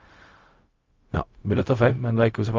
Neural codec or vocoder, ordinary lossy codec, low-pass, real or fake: codec, 16 kHz, 0.4 kbps, LongCat-Audio-Codec; Opus, 32 kbps; 7.2 kHz; fake